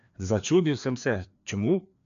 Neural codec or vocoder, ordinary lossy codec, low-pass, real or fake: codec, 16 kHz, 2 kbps, FreqCodec, larger model; none; 7.2 kHz; fake